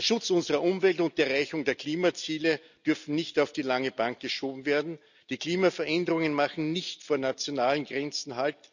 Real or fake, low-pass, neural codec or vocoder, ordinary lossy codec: real; 7.2 kHz; none; none